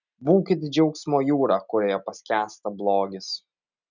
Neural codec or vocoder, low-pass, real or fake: none; 7.2 kHz; real